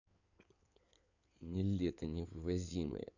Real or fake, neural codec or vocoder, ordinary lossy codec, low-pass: fake; codec, 16 kHz in and 24 kHz out, 2.2 kbps, FireRedTTS-2 codec; none; 7.2 kHz